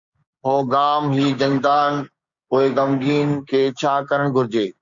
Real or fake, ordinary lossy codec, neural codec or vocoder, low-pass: fake; Opus, 64 kbps; codec, 16 kHz, 6 kbps, DAC; 7.2 kHz